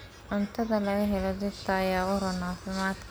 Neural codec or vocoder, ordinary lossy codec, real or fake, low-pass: none; none; real; none